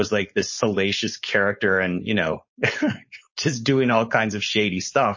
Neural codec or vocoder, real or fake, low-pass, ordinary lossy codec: codec, 16 kHz, 4.8 kbps, FACodec; fake; 7.2 kHz; MP3, 32 kbps